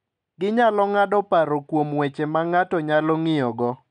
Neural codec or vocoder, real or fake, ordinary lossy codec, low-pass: none; real; MP3, 96 kbps; 9.9 kHz